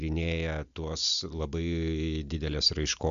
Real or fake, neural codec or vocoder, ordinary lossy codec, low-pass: real; none; MP3, 96 kbps; 7.2 kHz